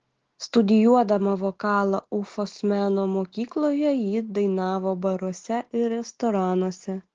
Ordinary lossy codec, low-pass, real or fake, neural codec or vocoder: Opus, 16 kbps; 7.2 kHz; real; none